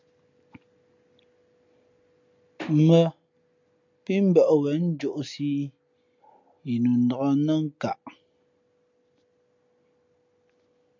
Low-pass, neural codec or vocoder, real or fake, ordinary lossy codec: 7.2 kHz; none; real; MP3, 64 kbps